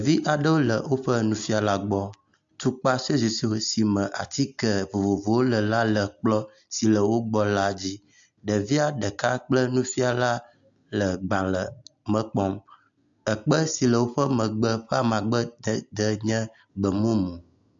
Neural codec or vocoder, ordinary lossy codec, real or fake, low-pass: none; AAC, 64 kbps; real; 7.2 kHz